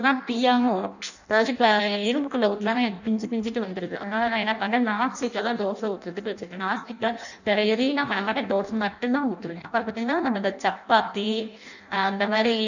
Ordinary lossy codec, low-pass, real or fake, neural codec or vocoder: none; 7.2 kHz; fake; codec, 16 kHz in and 24 kHz out, 0.6 kbps, FireRedTTS-2 codec